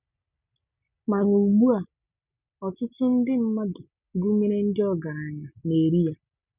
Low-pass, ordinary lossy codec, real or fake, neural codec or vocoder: 3.6 kHz; none; real; none